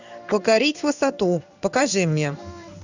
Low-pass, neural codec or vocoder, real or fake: 7.2 kHz; codec, 16 kHz in and 24 kHz out, 1 kbps, XY-Tokenizer; fake